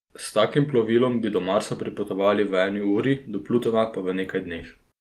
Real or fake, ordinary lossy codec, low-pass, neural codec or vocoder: real; Opus, 24 kbps; 14.4 kHz; none